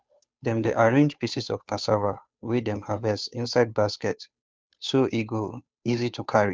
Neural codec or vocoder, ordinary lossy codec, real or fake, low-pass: codec, 16 kHz, 2 kbps, FunCodec, trained on Chinese and English, 25 frames a second; none; fake; none